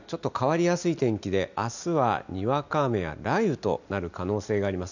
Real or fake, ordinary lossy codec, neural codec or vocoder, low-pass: real; none; none; 7.2 kHz